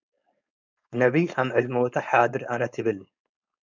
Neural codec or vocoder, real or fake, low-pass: codec, 16 kHz, 4.8 kbps, FACodec; fake; 7.2 kHz